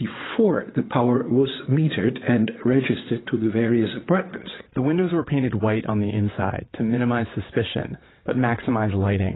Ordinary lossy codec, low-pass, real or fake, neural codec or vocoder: AAC, 16 kbps; 7.2 kHz; fake; vocoder, 22.05 kHz, 80 mel bands, WaveNeXt